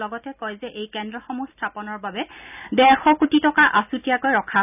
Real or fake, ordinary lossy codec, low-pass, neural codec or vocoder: real; none; 3.6 kHz; none